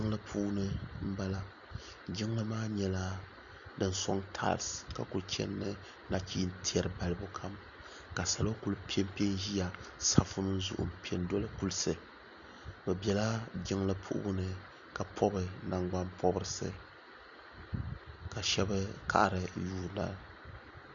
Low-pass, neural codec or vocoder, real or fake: 7.2 kHz; none; real